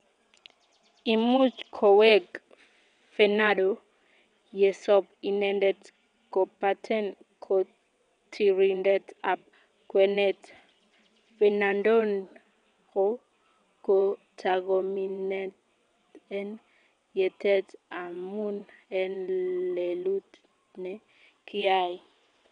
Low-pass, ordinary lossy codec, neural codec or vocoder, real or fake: 9.9 kHz; none; vocoder, 22.05 kHz, 80 mel bands, WaveNeXt; fake